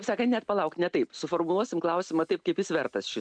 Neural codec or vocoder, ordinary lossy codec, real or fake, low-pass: none; MP3, 96 kbps; real; 9.9 kHz